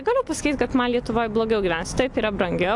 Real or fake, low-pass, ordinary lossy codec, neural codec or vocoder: real; 10.8 kHz; AAC, 64 kbps; none